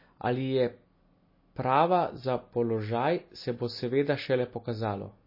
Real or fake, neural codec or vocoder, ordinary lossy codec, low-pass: real; none; MP3, 24 kbps; 5.4 kHz